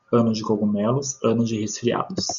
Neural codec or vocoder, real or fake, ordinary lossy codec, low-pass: none; real; MP3, 64 kbps; 7.2 kHz